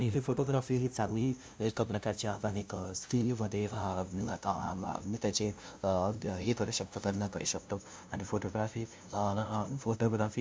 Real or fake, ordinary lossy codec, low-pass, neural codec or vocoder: fake; none; none; codec, 16 kHz, 0.5 kbps, FunCodec, trained on LibriTTS, 25 frames a second